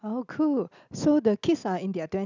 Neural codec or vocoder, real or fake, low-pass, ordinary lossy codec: none; real; 7.2 kHz; none